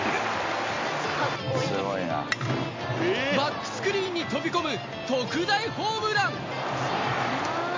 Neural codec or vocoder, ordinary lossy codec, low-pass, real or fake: none; MP3, 48 kbps; 7.2 kHz; real